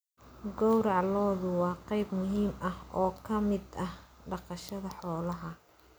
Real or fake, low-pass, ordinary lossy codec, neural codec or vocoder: real; none; none; none